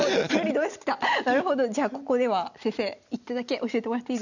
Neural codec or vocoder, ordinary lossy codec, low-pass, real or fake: none; none; 7.2 kHz; real